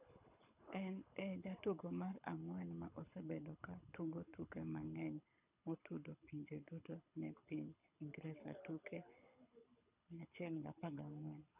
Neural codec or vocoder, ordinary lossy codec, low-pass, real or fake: codec, 24 kHz, 6 kbps, HILCodec; none; 3.6 kHz; fake